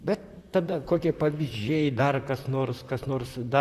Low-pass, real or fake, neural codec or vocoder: 14.4 kHz; fake; vocoder, 44.1 kHz, 128 mel bands, Pupu-Vocoder